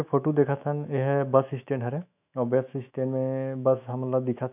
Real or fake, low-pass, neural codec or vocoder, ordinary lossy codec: fake; 3.6 kHz; autoencoder, 48 kHz, 128 numbers a frame, DAC-VAE, trained on Japanese speech; none